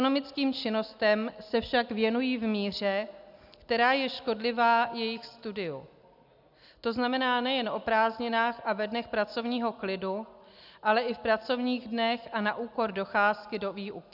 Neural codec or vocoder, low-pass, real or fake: none; 5.4 kHz; real